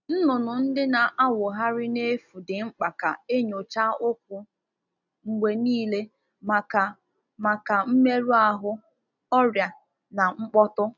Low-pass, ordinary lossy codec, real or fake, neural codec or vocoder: 7.2 kHz; none; real; none